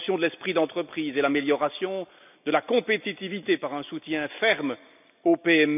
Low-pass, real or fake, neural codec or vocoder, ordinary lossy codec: 3.6 kHz; real; none; none